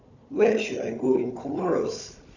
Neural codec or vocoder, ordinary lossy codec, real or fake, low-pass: codec, 16 kHz, 4 kbps, FunCodec, trained on Chinese and English, 50 frames a second; none; fake; 7.2 kHz